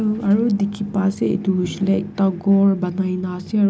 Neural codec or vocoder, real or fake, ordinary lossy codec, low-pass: none; real; none; none